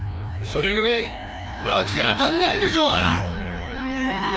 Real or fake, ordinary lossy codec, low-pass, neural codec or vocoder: fake; none; none; codec, 16 kHz, 1 kbps, FreqCodec, larger model